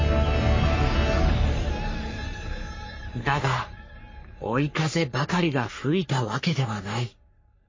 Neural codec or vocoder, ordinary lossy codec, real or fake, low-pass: codec, 44.1 kHz, 3.4 kbps, Pupu-Codec; MP3, 32 kbps; fake; 7.2 kHz